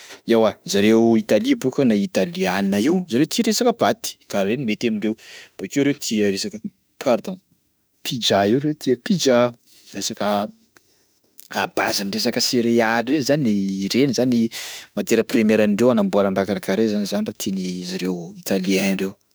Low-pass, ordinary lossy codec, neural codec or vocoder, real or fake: none; none; autoencoder, 48 kHz, 32 numbers a frame, DAC-VAE, trained on Japanese speech; fake